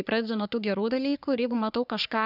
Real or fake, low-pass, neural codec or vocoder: fake; 5.4 kHz; codec, 44.1 kHz, 3.4 kbps, Pupu-Codec